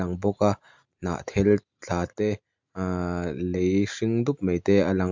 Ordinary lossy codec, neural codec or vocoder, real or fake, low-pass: MP3, 64 kbps; none; real; 7.2 kHz